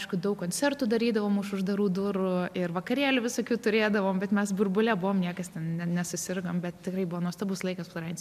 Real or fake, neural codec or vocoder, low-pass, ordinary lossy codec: real; none; 14.4 kHz; MP3, 96 kbps